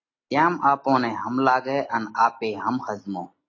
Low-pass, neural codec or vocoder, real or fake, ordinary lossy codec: 7.2 kHz; none; real; AAC, 32 kbps